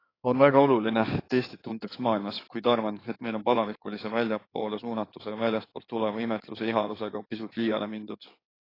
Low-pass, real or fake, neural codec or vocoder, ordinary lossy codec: 5.4 kHz; fake; codec, 16 kHz in and 24 kHz out, 2.2 kbps, FireRedTTS-2 codec; AAC, 24 kbps